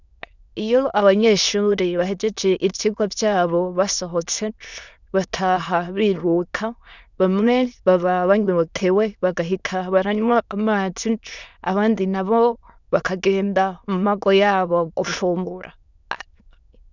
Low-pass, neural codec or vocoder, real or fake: 7.2 kHz; autoencoder, 22.05 kHz, a latent of 192 numbers a frame, VITS, trained on many speakers; fake